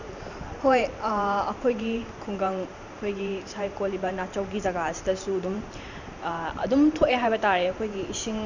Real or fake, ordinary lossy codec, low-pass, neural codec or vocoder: fake; none; 7.2 kHz; vocoder, 44.1 kHz, 128 mel bands every 512 samples, BigVGAN v2